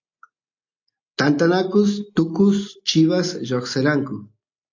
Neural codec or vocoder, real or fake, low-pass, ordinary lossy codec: none; real; 7.2 kHz; AAC, 48 kbps